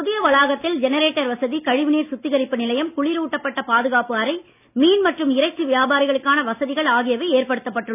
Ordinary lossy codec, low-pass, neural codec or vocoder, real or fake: none; 3.6 kHz; none; real